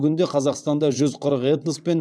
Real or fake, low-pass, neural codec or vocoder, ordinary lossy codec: fake; none; vocoder, 22.05 kHz, 80 mel bands, WaveNeXt; none